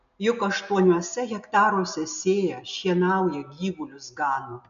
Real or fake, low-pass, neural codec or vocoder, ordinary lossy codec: real; 7.2 kHz; none; MP3, 96 kbps